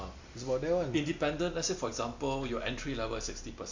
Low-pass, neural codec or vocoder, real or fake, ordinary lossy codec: 7.2 kHz; none; real; MP3, 64 kbps